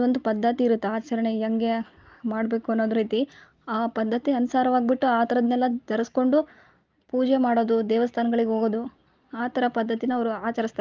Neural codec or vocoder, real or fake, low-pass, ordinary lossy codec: none; real; 7.2 kHz; Opus, 32 kbps